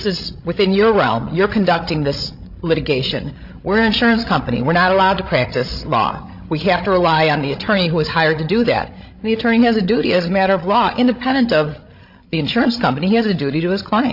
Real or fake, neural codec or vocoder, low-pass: fake; codec, 16 kHz, 16 kbps, FreqCodec, larger model; 5.4 kHz